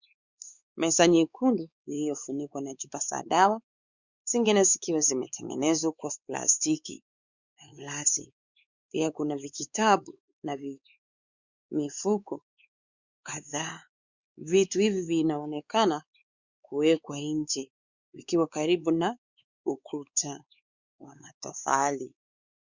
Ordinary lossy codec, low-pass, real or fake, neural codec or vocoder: Opus, 64 kbps; 7.2 kHz; fake; codec, 16 kHz, 4 kbps, X-Codec, WavLM features, trained on Multilingual LibriSpeech